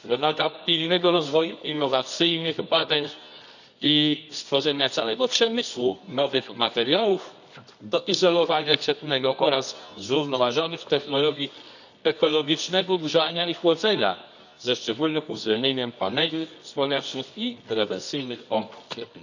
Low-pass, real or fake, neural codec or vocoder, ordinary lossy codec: 7.2 kHz; fake; codec, 24 kHz, 0.9 kbps, WavTokenizer, medium music audio release; none